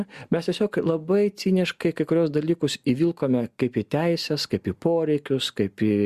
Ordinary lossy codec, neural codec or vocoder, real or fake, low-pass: Opus, 64 kbps; none; real; 14.4 kHz